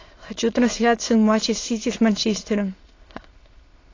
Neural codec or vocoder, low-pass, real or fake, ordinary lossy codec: autoencoder, 22.05 kHz, a latent of 192 numbers a frame, VITS, trained on many speakers; 7.2 kHz; fake; AAC, 32 kbps